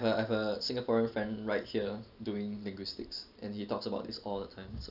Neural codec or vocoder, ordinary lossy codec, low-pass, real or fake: none; none; 5.4 kHz; real